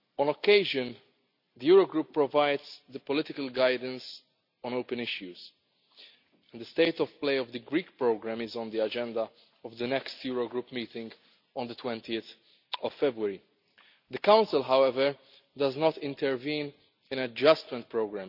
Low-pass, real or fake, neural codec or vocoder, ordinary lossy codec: 5.4 kHz; real; none; none